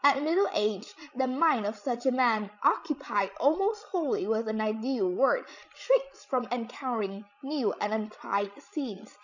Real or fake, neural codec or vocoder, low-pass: fake; codec, 16 kHz, 16 kbps, FreqCodec, larger model; 7.2 kHz